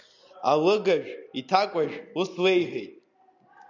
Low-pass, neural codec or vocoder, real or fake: 7.2 kHz; none; real